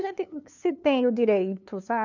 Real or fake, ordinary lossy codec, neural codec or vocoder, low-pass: fake; none; codec, 16 kHz, 2 kbps, FunCodec, trained on LibriTTS, 25 frames a second; 7.2 kHz